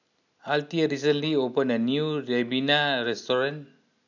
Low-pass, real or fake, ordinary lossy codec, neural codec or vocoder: 7.2 kHz; real; none; none